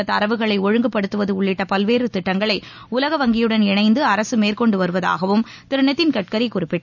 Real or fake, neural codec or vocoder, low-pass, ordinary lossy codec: real; none; 7.2 kHz; none